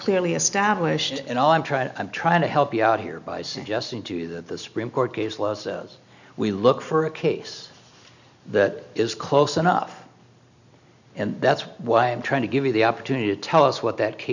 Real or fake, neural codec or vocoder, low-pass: real; none; 7.2 kHz